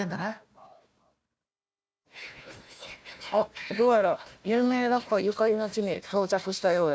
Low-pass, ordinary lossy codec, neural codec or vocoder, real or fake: none; none; codec, 16 kHz, 1 kbps, FunCodec, trained on Chinese and English, 50 frames a second; fake